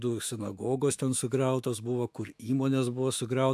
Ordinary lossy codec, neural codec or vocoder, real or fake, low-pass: AAC, 96 kbps; autoencoder, 48 kHz, 128 numbers a frame, DAC-VAE, trained on Japanese speech; fake; 14.4 kHz